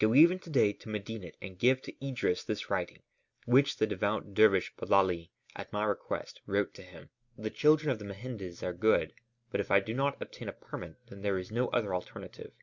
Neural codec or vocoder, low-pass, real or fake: none; 7.2 kHz; real